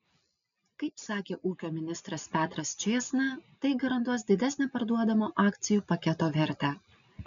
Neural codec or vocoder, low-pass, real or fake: none; 7.2 kHz; real